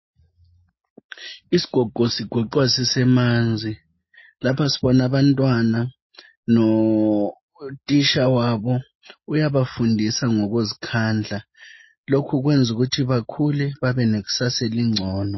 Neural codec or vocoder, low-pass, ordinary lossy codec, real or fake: none; 7.2 kHz; MP3, 24 kbps; real